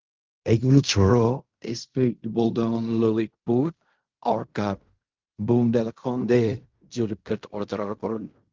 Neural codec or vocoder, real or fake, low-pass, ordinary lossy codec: codec, 16 kHz in and 24 kHz out, 0.4 kbps, LongCat-Audio-Codec, fine tuned four codebook decoder; fake; 7.2 kHz; Opus, 32 kbps